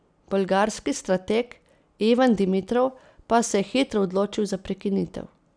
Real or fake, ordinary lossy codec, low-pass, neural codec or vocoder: real; none; 9.9 kHz; none